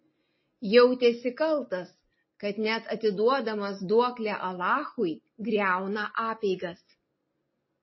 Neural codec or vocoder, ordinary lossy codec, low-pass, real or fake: none; MP3, 24 kbps; 7.2 kHz; real